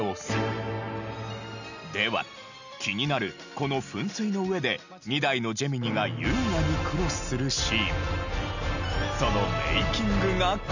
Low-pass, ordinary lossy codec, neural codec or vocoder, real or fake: 7.2 kHz; none; none; real